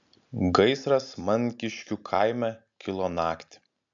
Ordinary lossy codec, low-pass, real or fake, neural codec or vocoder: MP3, 64 kbps; 7.2 kHz; real; none